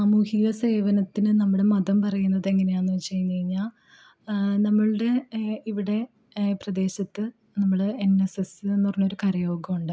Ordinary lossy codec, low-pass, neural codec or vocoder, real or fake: none; none; none; real